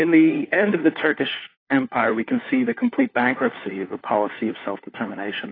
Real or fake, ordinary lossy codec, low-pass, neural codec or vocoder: fake; AAC, 24 kbps; 5.4 kHz; codec, 16 kHz, 4 kbps, FunCodec, trained on LibriTTS, 50 frames a second